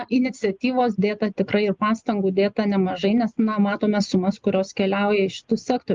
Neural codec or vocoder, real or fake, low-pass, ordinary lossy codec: none; real; 7.2 kHz; Opus, 32 kbps